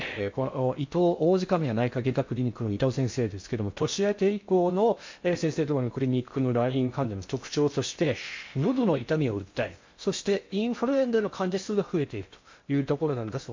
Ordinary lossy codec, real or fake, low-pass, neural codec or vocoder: MP3, 48 kbps; fake; 7.2 kHz; codec, 16 kHz in and 24 kHz out, 0.6 kbps, FocalCodec, streaming, 4096 codes